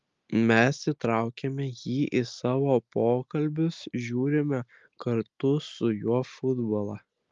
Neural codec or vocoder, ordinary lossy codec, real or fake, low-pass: none; Opus, 32 kbps; real; 7.2 kHz